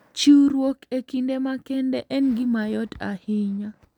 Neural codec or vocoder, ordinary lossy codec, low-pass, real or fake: none; none; 19.8 kHz; real